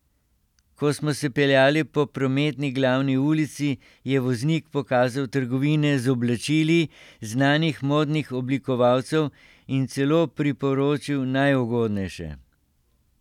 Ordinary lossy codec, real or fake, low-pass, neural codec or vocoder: none; real; 19.8 kHz; none